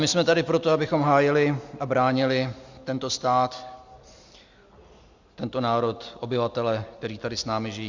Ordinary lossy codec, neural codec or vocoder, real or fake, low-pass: Opus, 64 kbps; none; real; 7.2 kHz